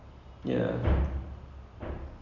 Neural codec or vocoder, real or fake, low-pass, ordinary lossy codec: none; real; 7.2 kHz; none